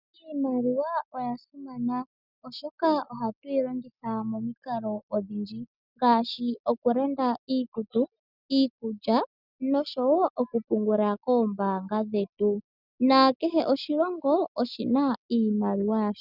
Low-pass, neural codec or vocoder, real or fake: 5.4 kHz; none; real